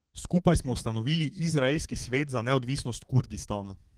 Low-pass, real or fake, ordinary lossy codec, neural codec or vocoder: 14.4 kHz; fake; Opus, 24 kbps; codec, 32 kHz, 1.9 kbps, SNAC